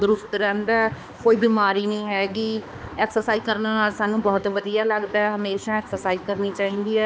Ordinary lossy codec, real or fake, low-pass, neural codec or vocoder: none; fake; none; codec, 16 kHz, 2 kbps, X-Codec, HuBERT features, trained on balanced general audio